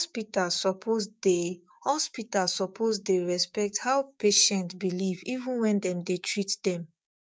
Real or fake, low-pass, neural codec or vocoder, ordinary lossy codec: fake; none; codec, 16 kHz, 6 kbps, DAC; none